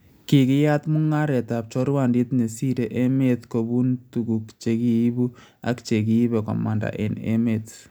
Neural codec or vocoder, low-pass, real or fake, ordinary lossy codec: none; none; real; none